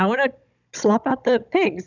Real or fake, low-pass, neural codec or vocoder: fake; 7.2 kHz; codec, 16 kHz, 16 kbps, FunCodec, trained on Chinese and English, 50 frames a second